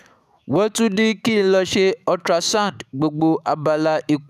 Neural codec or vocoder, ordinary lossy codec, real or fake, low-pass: autoencoder, 48 kHz, 128 numbers a frame, DAC-VAE, trained on Japanese speech; none; fake; 14.4 kHz